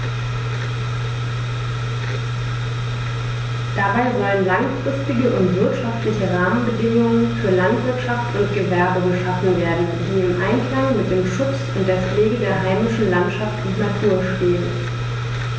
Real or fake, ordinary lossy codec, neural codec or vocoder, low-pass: real; none; none; none